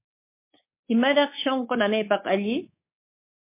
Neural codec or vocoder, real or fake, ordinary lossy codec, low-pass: codec, 24 kHz, 3.1 kbps, DualCodec; fake; MP3, 24 kbps; 3.6 kHz